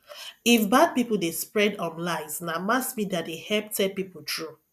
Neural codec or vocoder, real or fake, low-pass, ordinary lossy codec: none; real; 14.4 kHz; none